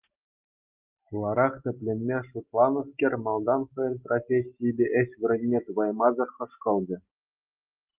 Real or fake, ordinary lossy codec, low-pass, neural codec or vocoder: real; Opus, 32 kbps; 3.6 kHz; none